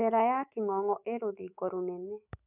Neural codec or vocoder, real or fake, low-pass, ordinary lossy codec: autoencoder, 48 kHz, 128 numbers a frame, DAC-VAE, trained on Japanese speech; fake; 3.6 kHz; none